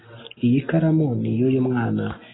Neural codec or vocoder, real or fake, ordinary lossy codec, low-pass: none; real; AAC, 16 kbps; 7.2 kHz